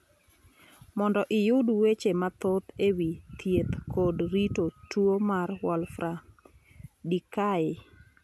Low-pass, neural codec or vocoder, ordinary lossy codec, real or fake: none; none; none; real